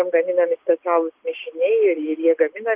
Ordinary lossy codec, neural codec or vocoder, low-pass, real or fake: Opus, 24 kbps; none; 3.6 kHz; real